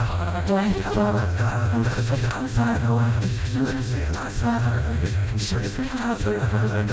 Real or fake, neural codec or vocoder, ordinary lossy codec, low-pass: fake; codec, 16 kHz, 0.5 kbps, FreqCodec, smaller model; none; none